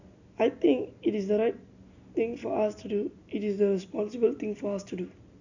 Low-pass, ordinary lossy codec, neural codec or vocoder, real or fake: 7.2 kHz; none; none; real